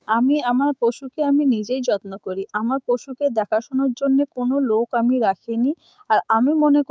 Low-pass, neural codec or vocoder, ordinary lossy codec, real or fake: none; codec, 16 kHz, 6 kbps, DAC; none; fake